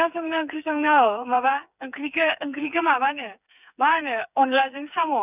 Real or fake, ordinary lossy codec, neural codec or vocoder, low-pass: fake; none; codec, 16 kHz, 4 kbps, FreqCodec, smaller model; 3.6 kHz